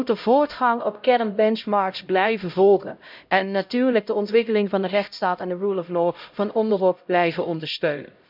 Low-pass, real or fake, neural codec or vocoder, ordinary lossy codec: 5.4 kHz; fake; codec, 16 kHz, 0.5 kbps, X-Codec, HuBERT features, trained on LibriSpeech; none